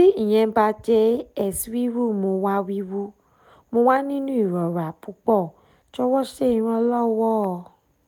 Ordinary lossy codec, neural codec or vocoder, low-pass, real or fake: none; none; 19.8 kHz; real